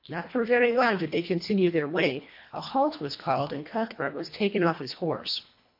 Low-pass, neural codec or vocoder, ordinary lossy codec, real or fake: 5.4 kHz; codec, 24 kHz, 1.5 kbps, HILCodec; MP3, 32 kbps; fake